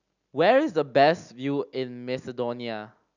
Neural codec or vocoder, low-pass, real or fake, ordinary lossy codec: none; 7.2 kHz; real; none